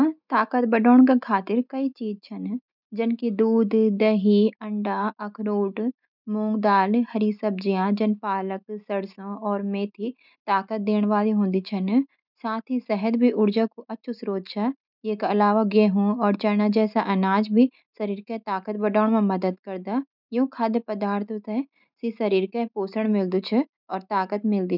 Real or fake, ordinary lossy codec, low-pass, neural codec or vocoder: real; none; 5.4 kHz; none